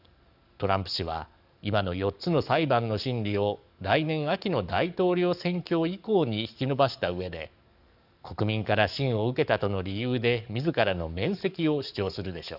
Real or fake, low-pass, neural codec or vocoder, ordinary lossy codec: fake; 5.4 kHz; codec, 44.1 kHz, 7.8 kbps, DAC; none